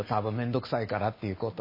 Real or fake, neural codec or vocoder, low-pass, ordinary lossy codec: real; none; 5.4 kHz; MP3, 24 kbps